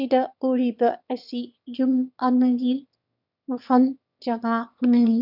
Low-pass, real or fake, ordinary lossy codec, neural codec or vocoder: 5.4 kHz; fake; none; autoencoder, 22.05 kHz, a latent of 192 numbers a frame, VITS, trained on one speaker